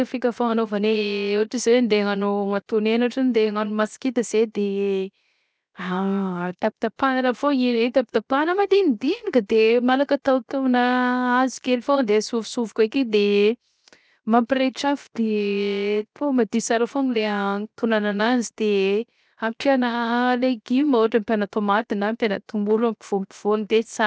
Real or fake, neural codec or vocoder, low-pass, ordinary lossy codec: fake; codec, 16 kHz, 0.7 kbps, FocalCodec; none; none